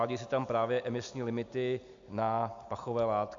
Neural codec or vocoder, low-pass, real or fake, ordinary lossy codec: none; 7.2 kHz; real; AAC, 64 kbps